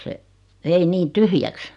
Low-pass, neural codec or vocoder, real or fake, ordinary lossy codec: 10.8 kHz; none; real; none